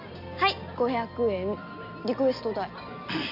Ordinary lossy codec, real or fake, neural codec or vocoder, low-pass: none; real; none; 5.4 kHz